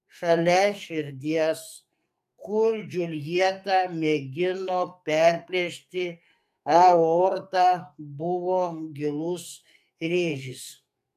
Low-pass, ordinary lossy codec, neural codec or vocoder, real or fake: 14.4 kHz; AAC, 96 kbps; codec, 44.1 kHz, 2.6 kbps, SNAC; fake